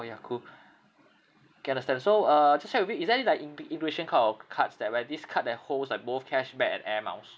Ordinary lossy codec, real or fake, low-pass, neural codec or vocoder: none; real; none; none